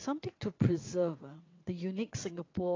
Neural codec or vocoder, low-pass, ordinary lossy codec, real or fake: vocoder, 22.05 kHz, 80 mel bands, WaveNeXt; 7.2 kHz; AAC, 32 kbps; fake